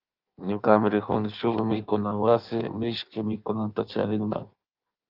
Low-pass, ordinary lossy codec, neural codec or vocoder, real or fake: 5.4 kHz; Opus, 32 kbps; codec, 16 kHz in and 24 kHz out, 1.1 kbps, FireRedTTS-2 codec; fake